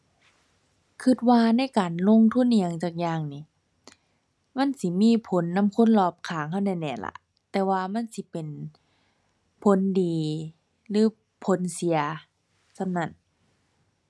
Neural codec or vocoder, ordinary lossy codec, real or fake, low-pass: none; none; real; none